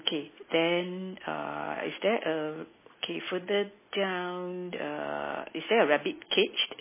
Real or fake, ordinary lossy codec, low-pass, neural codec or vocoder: real; MP3, 16 kbps; 3.6 kHz; none